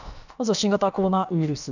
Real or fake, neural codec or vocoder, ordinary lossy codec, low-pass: fake; codec, 16 kHz, about 1 kbps, DyCAST, with the encoder's durations; none; 7.2 kHz